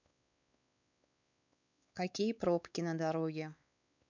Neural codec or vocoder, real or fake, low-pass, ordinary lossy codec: codec, 16 kHz, 4 kbps, X-Codec, WavLM features, trained on Multilingual LibriSpeech; fake; 7.2 kHz; none